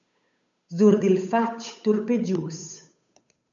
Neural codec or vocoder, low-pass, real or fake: codec, 16 kHz, 8 kbps, FunCodec, trained on Chinese and English, 25 frames a second; 7.2 kHz; fake